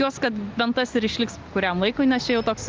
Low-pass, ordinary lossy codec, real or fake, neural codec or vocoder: 7.2 kHz; Opus, 24 kbps; real; none